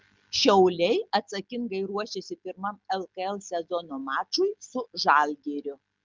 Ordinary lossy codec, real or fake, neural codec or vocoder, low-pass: Opus, 32 kbps; real; none; 7.2 kHz